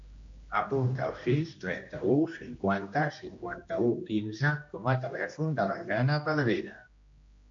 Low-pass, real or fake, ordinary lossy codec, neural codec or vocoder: 7.2 kHz; fake; MP3, 48 kbps; codec, 16 kHz, 1 kbps, X-Codec, HuBERT features, trained on general audio